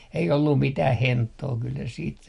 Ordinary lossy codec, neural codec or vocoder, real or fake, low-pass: MP3, 48 kbps; none; real; 14.4 kHz